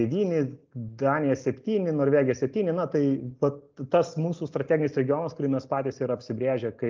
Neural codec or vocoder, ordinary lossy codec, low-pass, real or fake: none; Opus, 24 kbps; 7.2 kHz; real